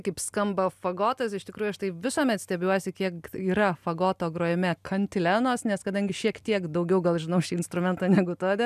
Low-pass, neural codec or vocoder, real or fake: 14.4 kHz; none; real